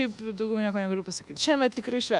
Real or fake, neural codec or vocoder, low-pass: fake; codec, 24 kHz, 1.2 kbps, DualCodec; 10.8 kHz